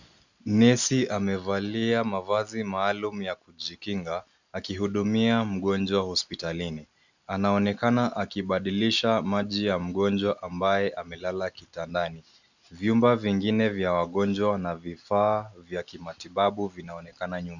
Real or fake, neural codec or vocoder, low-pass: real; none; 7.2 kHz